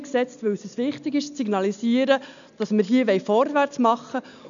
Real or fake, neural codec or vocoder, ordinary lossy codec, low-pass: real; none; none; 7.2 kHz